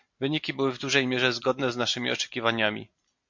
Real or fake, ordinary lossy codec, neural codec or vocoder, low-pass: real; MP3, 48 kbps; none; 7.2 kHz